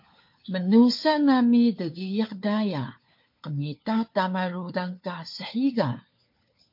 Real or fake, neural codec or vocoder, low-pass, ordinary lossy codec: fake; codec, 16 kHz, 4 kbps, FunCodec, trained on LibriTTS, 50 frames a second; 5.4 kHz; MP3, 32 kbps